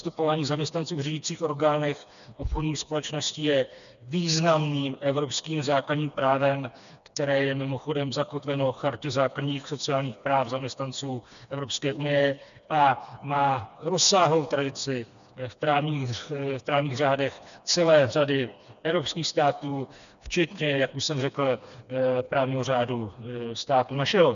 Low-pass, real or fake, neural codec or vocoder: 7.2 kHz; fake; codec, 16 kHz, 2 kbps, FreqCodec, smaller model